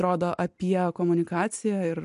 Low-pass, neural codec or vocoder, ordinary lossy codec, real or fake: 10.8 kHz; none; MP3, 64 kbps; real